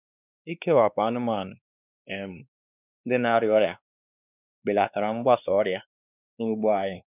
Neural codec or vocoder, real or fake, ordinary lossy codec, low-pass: codec, 16 kHz, 2 kbps, X-Codec, WavLM features, trained on Multilingual LibriSpeech; fake; none; 3.6 kHz